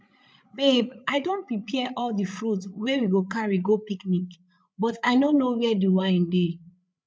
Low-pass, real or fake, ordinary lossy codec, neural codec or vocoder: none; fake; none; codec, 16 kHz, 8 kbps, FreqCodec, larger model